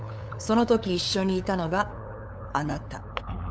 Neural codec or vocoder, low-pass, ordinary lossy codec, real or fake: codec, 16 kHz, 8 kbps, FunCodec, trained on LibriTTS, 25 frames a second; none; none; fake